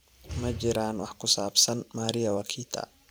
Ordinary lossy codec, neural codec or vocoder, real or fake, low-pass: none; vocoder, 44.1 kHz, 128 mel bands every 512 samples, BigVGAN v2; fake; none